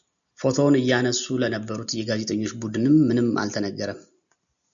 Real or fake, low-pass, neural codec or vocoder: real; 7.2 kHz; none